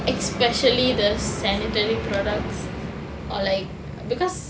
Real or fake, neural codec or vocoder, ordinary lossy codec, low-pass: real; none; none; none